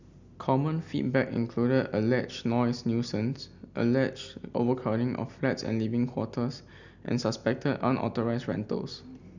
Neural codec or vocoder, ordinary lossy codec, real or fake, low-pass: none; none; real; 7.2 kHz